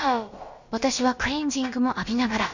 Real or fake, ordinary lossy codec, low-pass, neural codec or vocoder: fake; Opus, 64 kbps; 7.2 kHz; codec, 16 kHz, about 1 kbps, DyCAST, with the encoder's durations